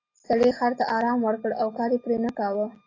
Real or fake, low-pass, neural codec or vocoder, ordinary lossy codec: real; 7.2 kHz; none; AAC, 32 kbps